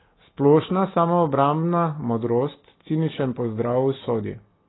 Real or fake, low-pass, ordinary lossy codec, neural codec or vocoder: real; 7.2 kHz; AAC, 16 kbps; none